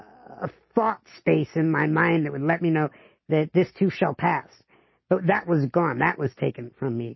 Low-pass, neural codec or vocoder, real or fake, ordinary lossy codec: 7.2 kHz; none; real; MP3, 24 kbps